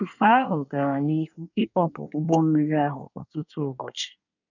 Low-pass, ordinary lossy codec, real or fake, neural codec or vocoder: 7.2 kHz; none; fake; codec, 24 kHz, 1 kbps, SNAC